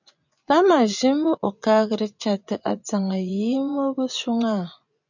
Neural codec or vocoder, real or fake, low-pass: vocoder, 24 kHz, 100 mel bands, Vocos; fake; 7.2 kHz